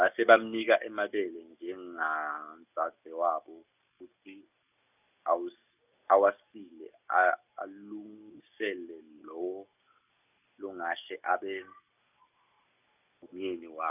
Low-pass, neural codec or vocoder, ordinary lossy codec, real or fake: 3.6 kHz; none; none; real